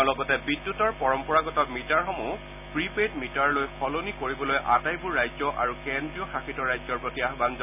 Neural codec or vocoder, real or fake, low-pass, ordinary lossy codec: none; real; 3.6 kHz; none